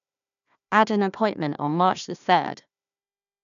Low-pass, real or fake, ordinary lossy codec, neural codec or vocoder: 7.2 kHz; fake; none; codec, 16 kHz, 1 kbps, FunCodec, trained on Chinese and English, 50 frames a second